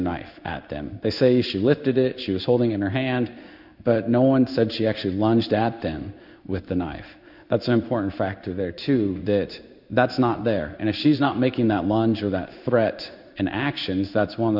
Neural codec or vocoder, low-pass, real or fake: codec, 16 kHz in and 24 kHz out, 1 kbps, XY-Tokenizer; 5.4 kHz; fake